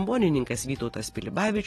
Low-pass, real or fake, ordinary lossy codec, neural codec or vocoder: 14.4 kHz; real; AAC, 32 kbps; none